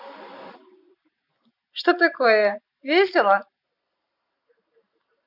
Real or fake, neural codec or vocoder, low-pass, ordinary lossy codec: real; none; 5.4 kHz; none